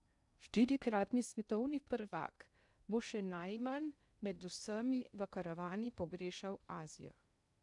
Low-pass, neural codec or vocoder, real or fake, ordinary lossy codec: 10.8 kHz; codec, 16 kHz in and 24 kHz out, 0.6 kbps, FocalCodec, streaming, 2048 codes; fake; none